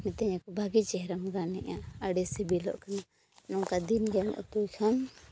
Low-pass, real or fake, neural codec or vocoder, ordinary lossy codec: none; real; none; none